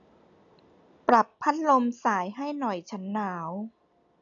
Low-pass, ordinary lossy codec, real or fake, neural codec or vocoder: 7.2 kHz; none; real; none